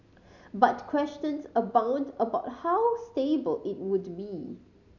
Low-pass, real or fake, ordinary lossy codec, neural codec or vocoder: 7.2 kHz; real; none; none